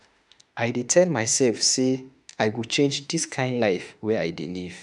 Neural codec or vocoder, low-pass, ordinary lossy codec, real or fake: autoencoder, 48 kHz, 32 numbers a frame, DAC-VAE, trained on Japanese speech; 10.8 kHz; none; fake